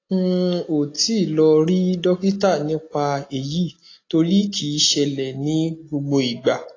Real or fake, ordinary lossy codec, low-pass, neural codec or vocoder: real; AAC, 32 kbps; 7.2 kHz; none